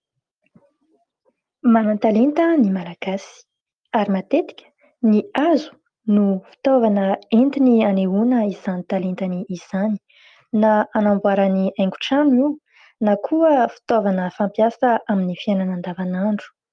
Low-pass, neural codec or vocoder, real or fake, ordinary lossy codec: 9.9 kHz; none; real; Opus, 32 kbps